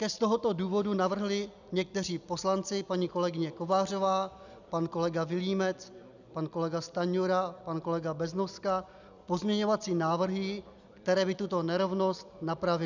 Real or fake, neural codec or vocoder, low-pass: real; none; 7.2 kHz